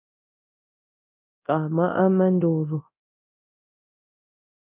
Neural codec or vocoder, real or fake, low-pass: codec, 24 kHz, 0.9 kbps, DualCodec; fake; 3.6 kHz